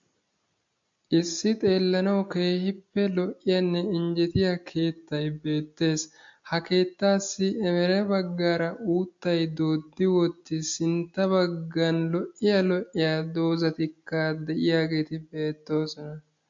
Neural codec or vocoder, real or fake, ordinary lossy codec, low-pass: none; real; MP3, 48 kbps; 7.2 kHz